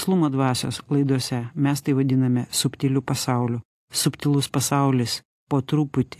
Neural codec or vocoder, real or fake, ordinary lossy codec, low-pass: none; real; AAC, 64 kbps; 14.4 kHz